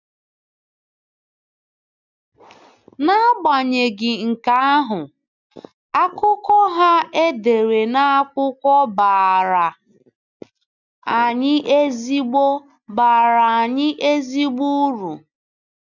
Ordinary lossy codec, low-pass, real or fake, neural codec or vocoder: none; 7.2 kHz; real; none